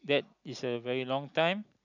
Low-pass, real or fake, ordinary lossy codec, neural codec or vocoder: 7.2 kHz; real; none; none